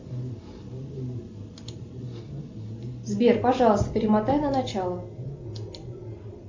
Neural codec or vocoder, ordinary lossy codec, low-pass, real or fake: none; MP3, 64 kbps; 7.2 kHz; real